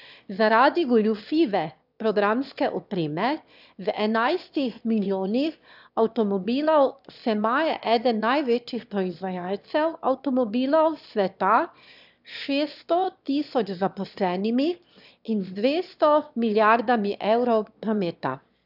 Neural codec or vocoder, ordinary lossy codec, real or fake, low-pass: autoencoder, 22.05 kHz, a latent of 192 numbers a frame, VITS, trained on one speaker; none; fake; 5.4 kHz